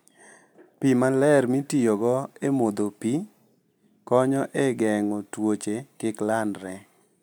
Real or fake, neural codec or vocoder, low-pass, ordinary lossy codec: real; none; none; none